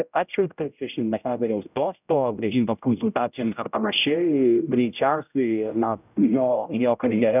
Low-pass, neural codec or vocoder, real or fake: 3.6 kHz; codec, 16 kHz, 0.5 kbps, X-Codec, HuBERT features, trained on general audio; fake